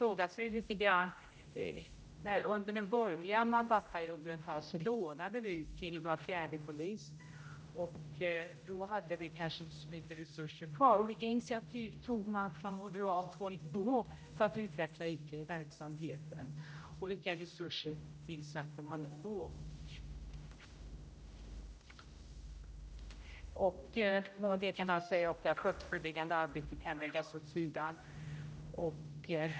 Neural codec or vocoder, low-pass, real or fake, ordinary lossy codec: codec, 16 kHz, 0.5 kbps, X-Codec, HuBERT features, trained on general audio; none; fake; none